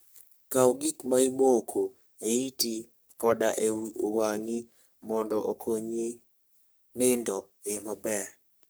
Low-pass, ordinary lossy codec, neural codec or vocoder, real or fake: none; none; codec, 44.1 kHz, 3.4 kbps, Pupu-Codec; fake